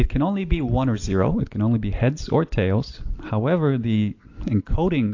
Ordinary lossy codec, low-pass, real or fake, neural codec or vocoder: AAC, 48 kbps; 7.2 kHz; real; none